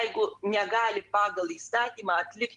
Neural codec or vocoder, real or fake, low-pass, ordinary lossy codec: none; real; 10.8 kHz; Opus, 24 kbps